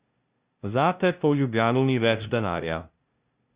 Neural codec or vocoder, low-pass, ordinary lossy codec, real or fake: codec, 16 kHz, 0.5 kbps, FunCodec, trained on LibriTTS, 25 frames a second; 3.6 kHz; Opus, 64 kbps; fake